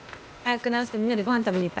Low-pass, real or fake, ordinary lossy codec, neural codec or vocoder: none; fake; none; codec, 16 kHz, 0.8 kbps, ZipCodec